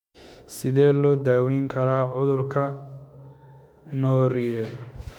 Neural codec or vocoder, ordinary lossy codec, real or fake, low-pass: autoencoder, 48 kHz, 32 numbers a frame, DAC-VAE, trained on Japanese speech; MP3, 96 kbps; fake; 19.8 kHz